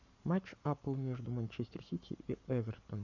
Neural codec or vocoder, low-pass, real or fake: codec, 44.1 kHz, 7.8 kbps, Pupu-Codec; 7.2 kHz; fake